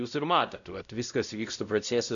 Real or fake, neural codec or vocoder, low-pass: fake; codec, 16 kHz, 0.5 kbps, X-Codec, WavLM features, trained on Multilingual LibriSpeech; 7.2 kHz